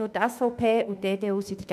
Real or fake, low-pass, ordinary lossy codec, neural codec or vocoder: fake; 14.4 kHz; none; autoencoder, 48 kHz, 32 numbers a frame, DAC-VAE, trained on Japanese speech